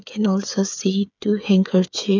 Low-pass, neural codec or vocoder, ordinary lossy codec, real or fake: 7.2 kHz; codec, 16 kHz, 8 kbps, FreqCodec, smaller model; none; fake